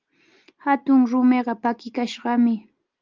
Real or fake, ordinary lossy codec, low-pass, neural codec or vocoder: real; Opus, 24 kbps; 7.2 kHz; none